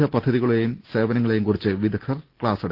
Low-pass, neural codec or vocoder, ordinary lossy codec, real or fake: 5.4 kHz; none; Opus, 16 kbps; real